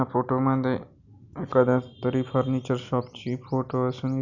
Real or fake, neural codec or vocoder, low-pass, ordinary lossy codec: real; none; 7.2 kHz; none